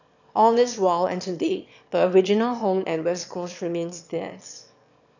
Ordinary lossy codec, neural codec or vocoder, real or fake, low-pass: none; autoencoder, 22.05 kHz, a latent of 192 numbers a frame, VITS, trained on one speaker; fake; 7.2 kHz